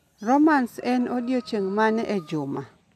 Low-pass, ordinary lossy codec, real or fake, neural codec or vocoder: 14.4 kHz; none; real; none